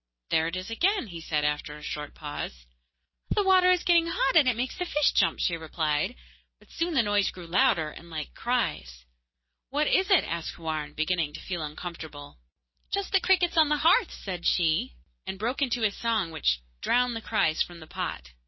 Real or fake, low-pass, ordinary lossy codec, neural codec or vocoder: real; 7.2 kHz; MP3, 24 kbps; none